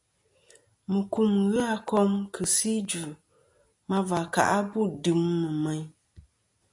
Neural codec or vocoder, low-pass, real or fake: none; 10.8 kHz; real